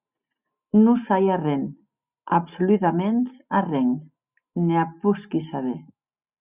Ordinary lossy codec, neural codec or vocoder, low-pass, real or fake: Opus, 64 kbps; none; 3.6 kHz; real